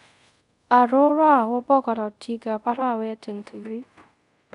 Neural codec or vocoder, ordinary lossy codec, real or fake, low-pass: codec, 24 kHz, 0.5 kbps, DualCodec; none; fake; 10.8 kHz